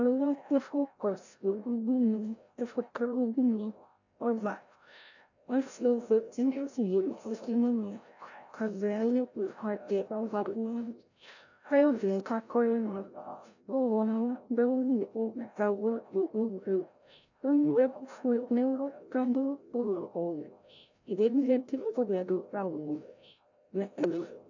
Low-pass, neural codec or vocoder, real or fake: 7.2 kHz; codec, 16 kHz, 0.5 kbps, FreqCodec, larger model; fake